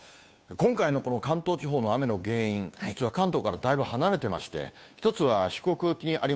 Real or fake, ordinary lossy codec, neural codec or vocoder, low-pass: fake; none; codec, 16 kHz, 2 kbps, FunCodec, trained on Chinese and English, 25 frames a second; none